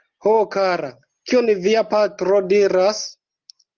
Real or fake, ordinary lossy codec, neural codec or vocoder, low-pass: real; Opus, 32 kbps; none; 7.2 kHz